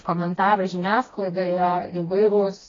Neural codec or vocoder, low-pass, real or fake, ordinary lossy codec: codec, 16 kHz, 1 kbps, FreqCodec, smaller model; 7.2 kHz; fake; AAC, 32 kbps